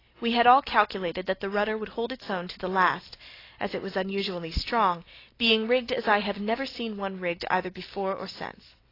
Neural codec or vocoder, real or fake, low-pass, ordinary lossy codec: none; real; 5.4 kHz; AAC, 24 kbps